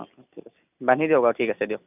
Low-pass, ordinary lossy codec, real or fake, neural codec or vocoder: 3.6 kHz; none; real; none